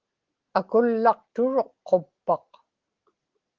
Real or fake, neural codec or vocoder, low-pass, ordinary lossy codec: real; none; 7.2 kHz; Opus, 16 kbps